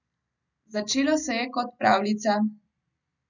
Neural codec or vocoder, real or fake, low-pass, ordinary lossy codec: none; real; 7.2 kHz; none